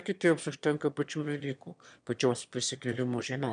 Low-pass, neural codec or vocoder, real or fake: 9.9 kHz; autoencoder, 22.05 kHz, a latent of 192 numbers a frame, VITS, trained on one speaker; fake